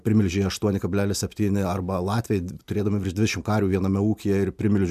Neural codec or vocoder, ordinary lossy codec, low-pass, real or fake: none; MP3, 96 kbps; 14.4 kHz; real